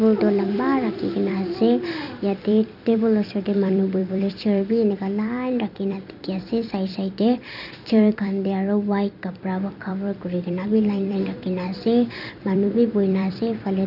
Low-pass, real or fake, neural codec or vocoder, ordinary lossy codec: 5.4 kHz; fake; vocoder, 44.1 kHz, 128 mel bands every 256 samples, BigVGAN v2; none